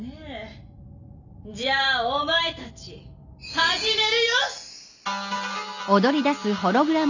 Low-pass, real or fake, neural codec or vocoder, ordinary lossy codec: 7.2 kHz; real; none; none